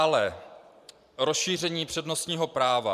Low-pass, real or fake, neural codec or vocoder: 14.4 kHz; fake; vocoder, 44.1 kHz, 128 mel bands every 512 samples, BigVGAN v2